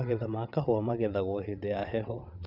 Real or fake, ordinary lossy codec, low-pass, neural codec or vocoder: fake; none; 5.4 kHz; vocoder, 22.05 kHz, 80 mel bands, WaveNeXt